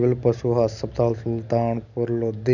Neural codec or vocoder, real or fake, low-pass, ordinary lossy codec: none; real; 7.2 kHz; none